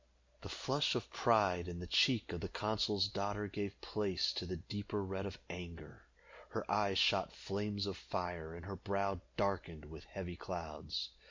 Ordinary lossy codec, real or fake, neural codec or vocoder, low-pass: MP3, 64 kbps; real; none; 7.2 kHz